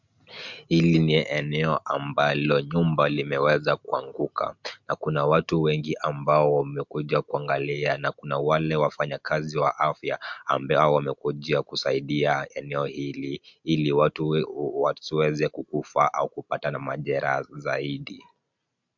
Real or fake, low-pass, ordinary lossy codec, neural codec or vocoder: real; 7.2 kHz; MP3, 64 kbps; none